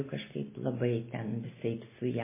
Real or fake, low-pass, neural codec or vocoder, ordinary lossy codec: real; 3.6 kHz; none; MP3, 16 kbps